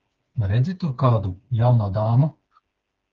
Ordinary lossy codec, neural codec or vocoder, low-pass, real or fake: Opus, 24 kbps; codec, 16 kHz, 4 kbps, FreqCodec, smaller model; 7.2 kHz; fake